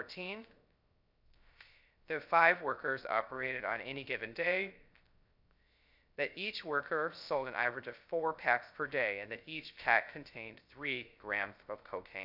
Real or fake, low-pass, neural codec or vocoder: fake; 5.4 kHz; codec, 16 kHz, 0.3 kbps, FocalCodec